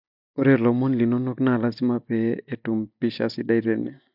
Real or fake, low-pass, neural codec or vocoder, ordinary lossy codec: real; 5.4 kHz; none; none